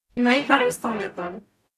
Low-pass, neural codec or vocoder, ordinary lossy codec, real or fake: 14.4 kHz; codec, 44.1 kHz, 0.9 kbps, DAC; none; fake